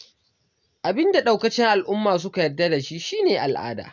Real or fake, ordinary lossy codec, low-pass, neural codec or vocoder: real; none; 7.2 kHz; none